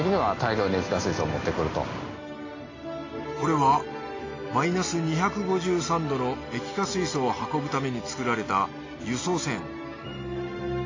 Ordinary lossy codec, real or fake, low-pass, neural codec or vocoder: AAC, 32 kbps; real; 7.2 kHz; none